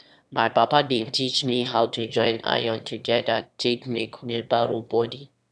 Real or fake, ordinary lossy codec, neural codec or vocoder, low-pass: fake; none; autoencoder, 22.05 kHz, a latent of 192 numbers a frame, VITS, trained on one speaker; none